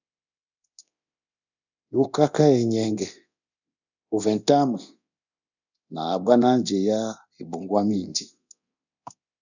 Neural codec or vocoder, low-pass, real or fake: codec, 24 kHz, 0.9 kbps, DualCodec; 7.2 kHz; fake